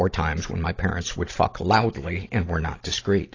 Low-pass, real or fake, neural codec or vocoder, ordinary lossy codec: 7.2 kHz; real; none; AAC, 32 kbps